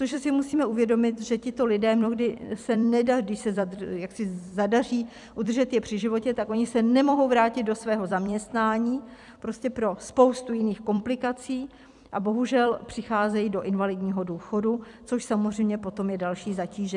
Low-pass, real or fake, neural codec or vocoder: 10.8 kHz; fake; vocoder, 44.1 kHz, 128 mel bands every 256 samples, BigVGAN v2